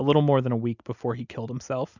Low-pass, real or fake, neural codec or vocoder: 7.2 kHz; real; none